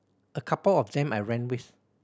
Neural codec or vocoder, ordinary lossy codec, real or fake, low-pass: none; none; real; none